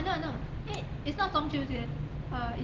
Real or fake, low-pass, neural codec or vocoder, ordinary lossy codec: real; 7.2 kHz; none; Opus, 16 kbps